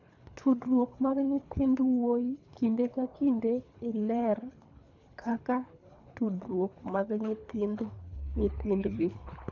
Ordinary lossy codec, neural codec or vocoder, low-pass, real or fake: none; codec, 24 kHz, 3 kbps, HILCodec; 7.2 kHz; fake